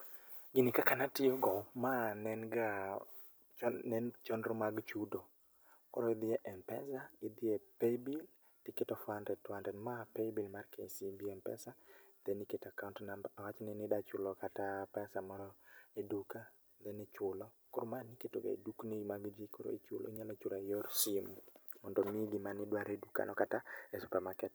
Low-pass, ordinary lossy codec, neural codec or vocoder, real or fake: none; none; none; real